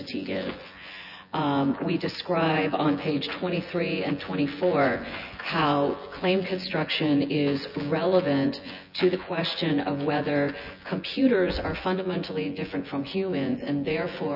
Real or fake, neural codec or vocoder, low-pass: fake; vocoder, 24 kHz, 100 mel bands, Vocos; 5.4 kHz